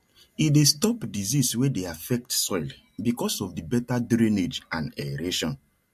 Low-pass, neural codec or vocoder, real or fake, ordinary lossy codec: 14.4 kHz; none; real; MP3, 64 kbps